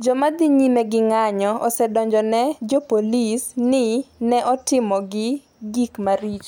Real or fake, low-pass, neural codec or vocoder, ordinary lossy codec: real; none; none; none